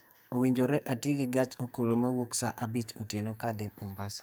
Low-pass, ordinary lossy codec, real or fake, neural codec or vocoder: none; none; fake; codec, 44.1 kHz, 2.6 kbps, SNAC